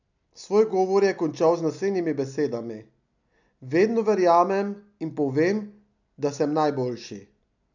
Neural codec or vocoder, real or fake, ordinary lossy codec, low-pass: none; real; none; 7.2 kHz